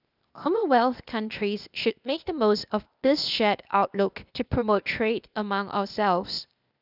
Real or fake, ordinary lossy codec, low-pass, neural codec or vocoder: fake; none; 5.4 kHz; codec, 16 kHz, 0.8 kbps, ZipCodec